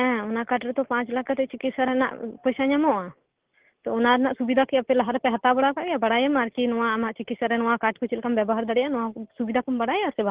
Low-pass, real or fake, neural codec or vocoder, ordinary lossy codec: 3.6 kHz; real; none; Opus, 16 kbps